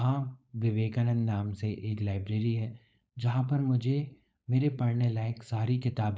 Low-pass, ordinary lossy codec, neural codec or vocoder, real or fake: none; none; codec, 16 kHz, 4.8 kbps, FACodec; fake